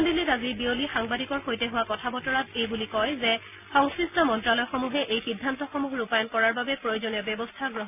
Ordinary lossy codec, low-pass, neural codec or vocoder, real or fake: none; 3.6 kHz; none; real